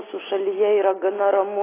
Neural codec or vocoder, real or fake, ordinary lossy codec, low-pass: none; real; AAC, 16 kbps; 3.6 kHz